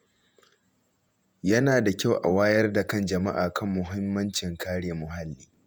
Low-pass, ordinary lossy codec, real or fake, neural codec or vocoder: none; none; fake; vocoder, 48 kHz, 128 mel bands, Vocos